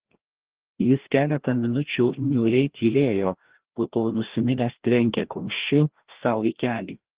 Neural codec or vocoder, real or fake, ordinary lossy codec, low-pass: codec, 16 kHz, 1 kbps, FreqCodec, larger model; fake; Opus, 16 kbps; 3.6 kHz